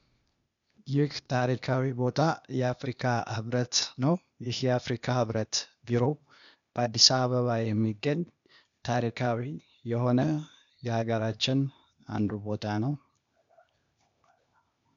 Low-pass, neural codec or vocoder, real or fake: 7.2 kHz; codec, 16 kHz, 0.8 kbps, ZipCodec; fake